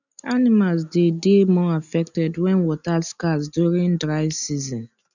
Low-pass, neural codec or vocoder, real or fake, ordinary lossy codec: 7.2 kHz; none; real; none